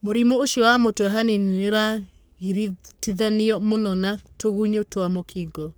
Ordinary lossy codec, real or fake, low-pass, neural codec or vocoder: none; fake; none; codec, 44.1 kHz, 3.4 kbps, Pupu-Codec